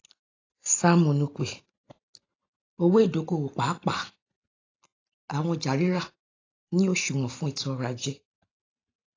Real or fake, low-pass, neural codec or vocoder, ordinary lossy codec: real; 7.2 kHz; none; AAC, 32 kbps